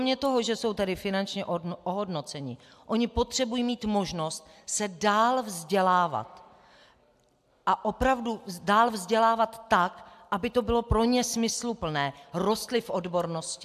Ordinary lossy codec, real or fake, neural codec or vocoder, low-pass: AAC, 96 kbps; real; none; 14.4 kHz